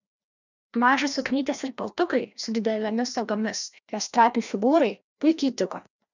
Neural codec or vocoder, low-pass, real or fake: codec, 16 kHz, 1 kbps, FreqCodec, larger model; 7.2 kHz; fake